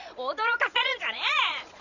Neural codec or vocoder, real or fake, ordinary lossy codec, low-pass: vocoder, 22.05 kHz, 80 mel bands, Vocos; fake; none; 7.2 kHz